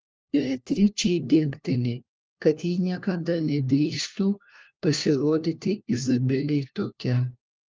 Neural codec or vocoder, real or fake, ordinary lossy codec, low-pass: codec, 16 kHz, 1 kbps, FunCodec, trained on LibriTTS, 50 frames a second; fake; Opus, 24 kbps; 7.2 kHz